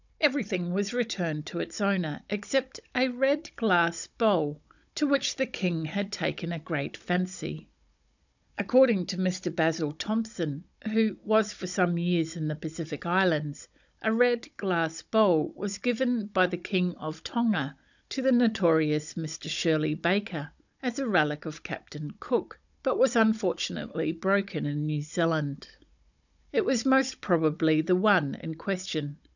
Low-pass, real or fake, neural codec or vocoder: 7.2 kHz; fake; codec, 16 kHz, 16 kbps, FunCodec, trained on Chinese and English, 50 frames a second